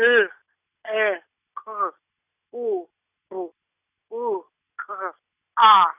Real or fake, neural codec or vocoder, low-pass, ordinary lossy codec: real; none; 3.6 kHz; none